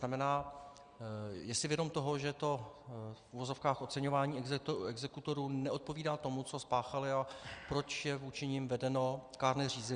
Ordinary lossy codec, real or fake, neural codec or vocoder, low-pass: Opus, 64 kbps; real; none; 9.9 kHz